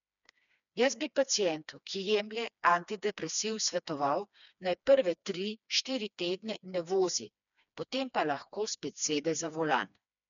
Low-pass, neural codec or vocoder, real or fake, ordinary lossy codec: 7.2 kHz; codec, 16 kHz, 2 kbps, FreqCodec, smaller model; fake; MP3, 96 kbps